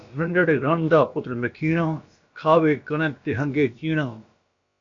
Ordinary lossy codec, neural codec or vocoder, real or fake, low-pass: AAC, 64 kbps; codec, 16 kHz, about 1 kbps, DyCAST, with the encoder's durations; fake; 7.2 kHz